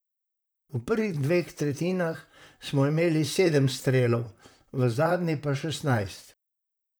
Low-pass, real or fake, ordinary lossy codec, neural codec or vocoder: none; fake; none; vocoder, 44.1 kHz, 128 mel bands, Pupu-Vocoder